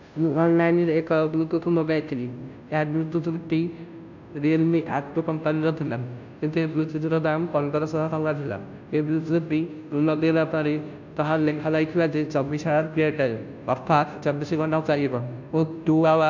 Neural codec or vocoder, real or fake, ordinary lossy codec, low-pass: codec, 16 kHz, 0.5 kbps, FunCodec, trained on Chinese and English, 25 frames a second; fake; none; 7.2 kHz